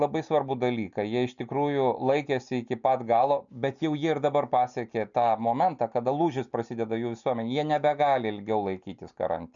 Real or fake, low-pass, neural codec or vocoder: real; 7.2 kHz; none